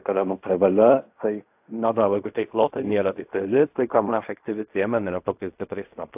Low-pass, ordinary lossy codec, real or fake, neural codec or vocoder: 3.6 kHz; AAC, 32 kbps; fake; codec, 16 kHz in and 24 kHz out, 0.4 kbps, LongCat-Audio-Codec, fine tuned four codebook decoder